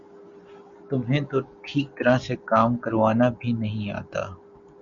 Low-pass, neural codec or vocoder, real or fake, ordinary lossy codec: 7.2 kHz; none; real; MP3, 64 kbps